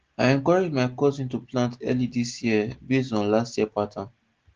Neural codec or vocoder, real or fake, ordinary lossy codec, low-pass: none; real; Opus, 16 kbps; 7.2 kHz